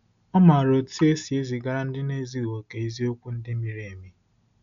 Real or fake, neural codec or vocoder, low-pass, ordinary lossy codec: real; none; 7.2 kHz; none